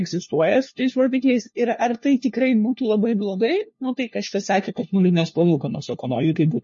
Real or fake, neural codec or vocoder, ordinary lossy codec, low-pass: fake; codec, 16 kHz, 1 kbps, FunCodec, trained on LibriTTS, 50 frames a second; MP3, 32 kbps; 7.2 kHz